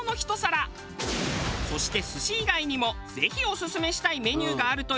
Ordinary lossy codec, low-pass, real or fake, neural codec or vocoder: none; none; real; none